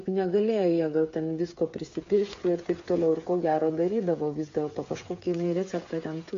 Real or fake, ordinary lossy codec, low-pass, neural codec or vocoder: fake; MP3, 48 kbps; 7.2 kHz; codec, 16 kHz, 8 kbps, FreqCodec, smaller model